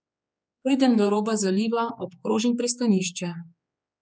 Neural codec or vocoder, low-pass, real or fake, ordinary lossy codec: codec, 16 kHz, 4 kbps, X-Codec, HuBERT features, trained on general audio; none; fake; none